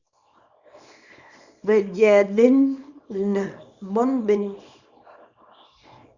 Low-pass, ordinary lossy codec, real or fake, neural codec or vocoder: 7.2 kHz; Opus, 64 kbps; fake; codec, 24 kHz, 0.9 kbps, WavTokenizer, small release